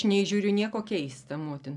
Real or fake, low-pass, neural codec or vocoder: real; 10.8 kHz; none